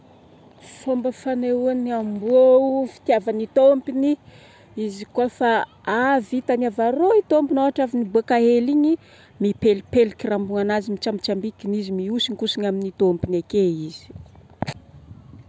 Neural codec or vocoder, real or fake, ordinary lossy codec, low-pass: none; real; none; none